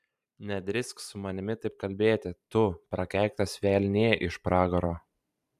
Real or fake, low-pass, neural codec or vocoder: fake; 14.4 kHz; vocoder, 44.1 kHz, 128 mel bands every 512 samples, BigVGAN v2